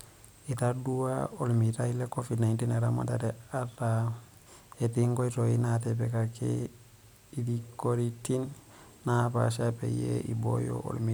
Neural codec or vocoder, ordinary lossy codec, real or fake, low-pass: none; none; real; none